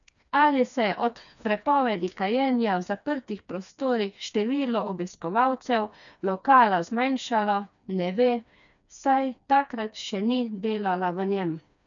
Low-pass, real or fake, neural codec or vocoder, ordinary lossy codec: 7.2 kHz; fake; codec, 16 kHz, 2 kbps, FreqCodec, smaller model; none